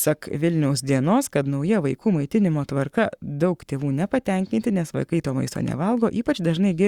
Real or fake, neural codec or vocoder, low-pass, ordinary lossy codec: fake; codec, 44.1 kHz, 7.8 kbps, Pupu-Codec; 19.8 kHz; Opus, 64 kbps